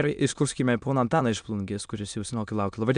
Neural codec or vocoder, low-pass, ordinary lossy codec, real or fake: autoencoder, 22.05 kHz, a latent of 192 numbers a frame, VITS, trained on many speakers; 9.9 kHz; MP3, 96 kbps; fake